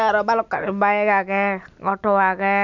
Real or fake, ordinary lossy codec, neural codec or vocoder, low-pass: real; none; none; 7.2 kHz